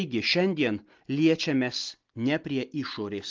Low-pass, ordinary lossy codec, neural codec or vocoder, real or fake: 7.2 kHz; Opus, 32 kbps; none; real